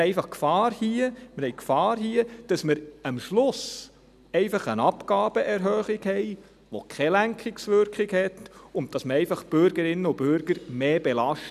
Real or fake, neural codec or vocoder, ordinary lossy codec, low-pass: real; none; none; 14.4 kHz